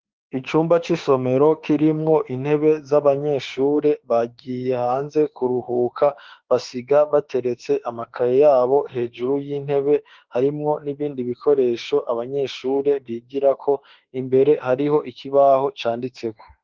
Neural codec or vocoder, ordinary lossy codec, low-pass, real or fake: autoencoder, 48 kHz, 32 numbers a frame, DAC-VAE, trained on Japanese speech; Opus, 16 kbps; 7.2 kHz; fake